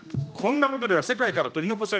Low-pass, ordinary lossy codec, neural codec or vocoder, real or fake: none; none; codec, 16 kHz, 1 kbps, X-Codec, HuBERT features, trained on general audio; fake